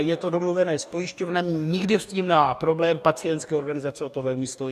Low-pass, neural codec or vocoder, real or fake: 14.4 kHz; codec, 44.1 kHz, 2.6 kbps, DAC; fake